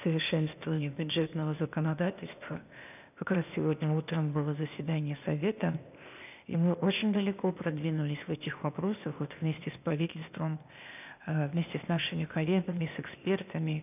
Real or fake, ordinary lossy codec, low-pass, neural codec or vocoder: fake; none; 3.6 kHz; codec, 16 kHz, 0.8 kbps, ZipCodec